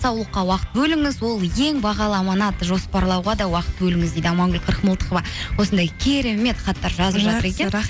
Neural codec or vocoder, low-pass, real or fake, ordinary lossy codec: none; none; real; none